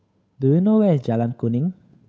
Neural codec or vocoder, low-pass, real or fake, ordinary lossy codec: codec, 16 kHz, 8 kbps, FunCodec, trained on Chinese and English, 25 frames a second; none; fake; none